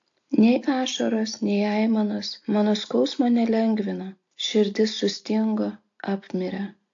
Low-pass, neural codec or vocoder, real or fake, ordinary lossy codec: 7.2 kHz; none; real; AAC, 48 kbps